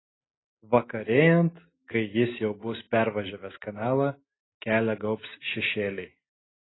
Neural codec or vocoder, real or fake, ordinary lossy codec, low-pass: none; real; AAC, 16 kbps; 7.2 kHz